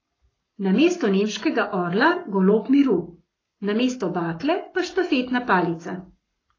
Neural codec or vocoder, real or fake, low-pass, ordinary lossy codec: codec, 44.1 kHz, 7.8 kbps, Pupu-Codec; fake; 7.2 kHz; AAC, 32 kbps